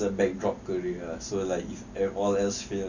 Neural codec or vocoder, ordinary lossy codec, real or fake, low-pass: none; none; real; 7.2 kHz